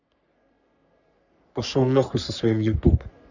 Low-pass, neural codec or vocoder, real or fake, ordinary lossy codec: 7.2 kHz; codec, 44.1 kHz, 3.4 kbps, Pupu-Codec; fake; none